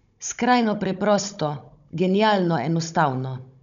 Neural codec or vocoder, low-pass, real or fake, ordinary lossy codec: codec, 16 kHz, 16 kbps, FunCodec, trained on Chinese and English, 50 frames a second; 7.2 kHz; fake; none